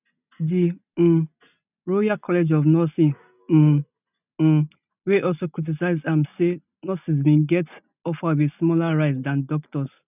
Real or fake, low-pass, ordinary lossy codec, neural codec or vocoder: real; 3.6 kHz; none; none